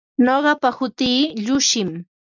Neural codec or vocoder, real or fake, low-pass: none; real; 7.2 kHz